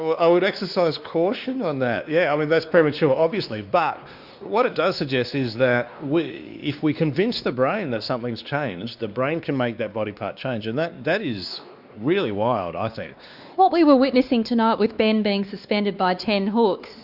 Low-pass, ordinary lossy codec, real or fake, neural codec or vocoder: 5.4 kHz; Opus, 64 kbps; fake; codec, 16 kHz, 2 kbps, X-Codec, WavLM features, trained on Multilingual LibriSpeech